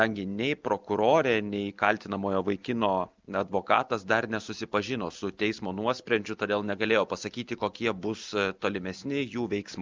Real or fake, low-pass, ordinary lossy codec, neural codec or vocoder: real; 7.2 kHz; Opus, 24 kbps; none